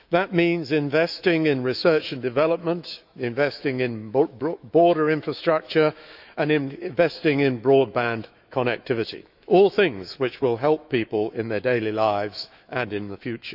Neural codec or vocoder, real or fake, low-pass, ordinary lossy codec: autoencoder, 48 kHz, 128 numbers a frame, DAC-VAE, trained on Japanese speech; fake; 5.4 kHz; none